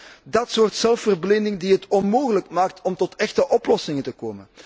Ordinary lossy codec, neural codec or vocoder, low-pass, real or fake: none; none; none; real